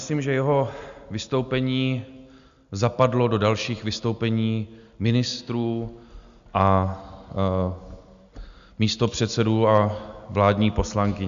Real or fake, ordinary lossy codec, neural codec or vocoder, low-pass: real; Opus, 64 kbps; none; 7.2 kHz